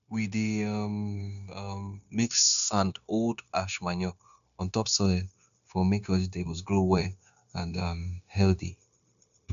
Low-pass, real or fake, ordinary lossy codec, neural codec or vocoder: 7.2 kHz; fake; none; codec, 16 kHz, 0.9 kbps, LongCat-Audio-Codec